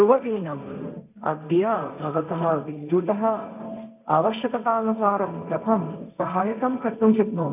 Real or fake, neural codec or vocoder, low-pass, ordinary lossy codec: fake; codec, 16 kHz, 1.1 kbps, Voila-Tokenizer; 3.6 kHz; none